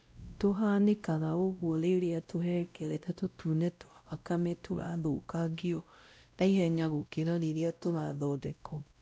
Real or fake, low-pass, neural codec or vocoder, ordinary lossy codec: fake; none; codec, 16 kHz, 0.5 kbps, X-Codec, WavLM features, trained on Multilingual LibriSpeech; none